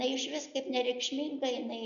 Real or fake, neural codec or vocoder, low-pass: real; none; 7.2 kHz